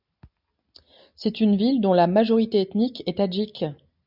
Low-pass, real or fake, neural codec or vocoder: 5.4 kHz; real; none